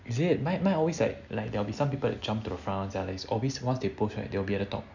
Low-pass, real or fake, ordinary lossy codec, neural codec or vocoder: 7.2 kHz; real; none; none